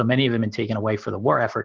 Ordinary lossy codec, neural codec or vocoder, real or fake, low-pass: Opus, 16 kbps; none; real; 7.2 kHz